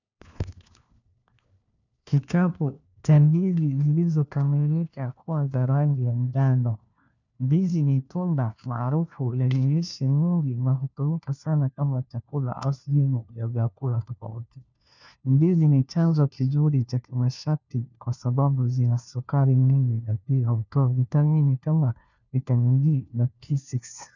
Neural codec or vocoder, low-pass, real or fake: codec, 16 kHz, 1 kbps, FunCodec, trained on LibriTTS, 50 frames a second; 7.2 kHz; fake